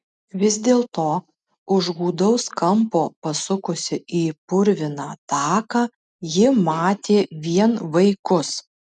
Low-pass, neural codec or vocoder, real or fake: 10.8 kHz; none; real